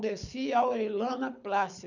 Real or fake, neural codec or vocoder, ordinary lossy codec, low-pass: fake; codec, 24 kHz, 6 kbps, HILCodec; none; 7.2 kHz